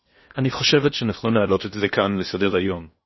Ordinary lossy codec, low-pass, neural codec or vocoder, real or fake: MP3, 24 kbps; 7.2 kHz; codec, 16 kHz in and 24 kHz out, 0.8 kbps, FocalCodec, streaming, 65536 codes; fake